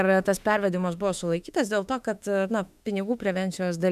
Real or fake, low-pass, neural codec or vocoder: fake; 14.4 kHz; autoencoder, 48 kHz, 32 numbers a frame, DAC-VAE, trained on Japanese speech